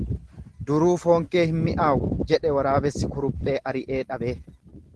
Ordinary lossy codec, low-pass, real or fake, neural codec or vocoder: Opus, 16 kbps; 10.8 kHz; real; none